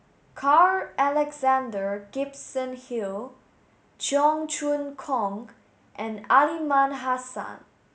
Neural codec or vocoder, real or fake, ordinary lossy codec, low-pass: none; real; none; none